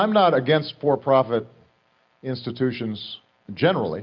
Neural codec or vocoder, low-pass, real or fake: none; 7.2 kHz; real